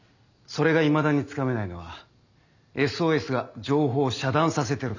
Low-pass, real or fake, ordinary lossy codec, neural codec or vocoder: 7.2 kHz; real; none; none